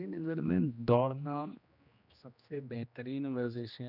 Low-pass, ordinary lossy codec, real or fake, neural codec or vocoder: 5.4 kHz; none; fake; codec, 16 kHz, 1 kbps, X-Codec, HuBERT features, trained on general audio